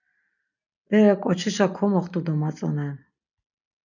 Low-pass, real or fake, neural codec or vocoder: 7.2 kHz; real; none